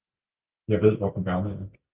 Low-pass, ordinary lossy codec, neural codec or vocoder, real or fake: 3.6 kHz; Opus, 24 kbps; none; real